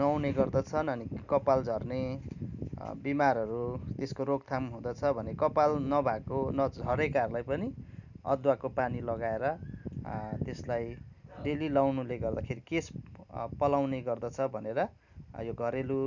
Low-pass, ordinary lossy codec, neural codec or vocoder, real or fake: 7.2 kHz; none; none; real